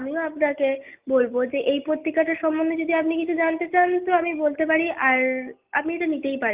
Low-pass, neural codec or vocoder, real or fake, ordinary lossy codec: 3.6 kHz; none; real; Opus, 32 kbps